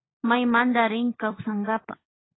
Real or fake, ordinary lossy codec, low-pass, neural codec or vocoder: fake; AAC, 16 kbps; 7.2 kHz; codec, 16 kHz, 16 kbps, FunCodec, trained on LibriTTS, 50 frames a second